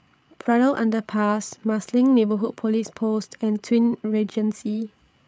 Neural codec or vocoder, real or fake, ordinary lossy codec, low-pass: codec, 16 kHz, 8 kbps, FreqCodec, larger model; fake; none; none